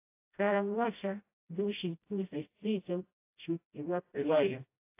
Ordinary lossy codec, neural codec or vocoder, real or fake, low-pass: AAC, 32 kbps; codec, 16 kHz, 0.5 kbps, FreqCodec, smaller model; fake; 3.6 kHz